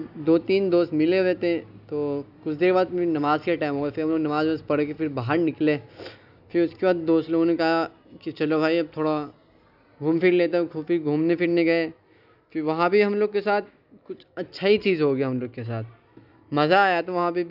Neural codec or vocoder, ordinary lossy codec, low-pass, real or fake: none; none; 5.4 kHz; real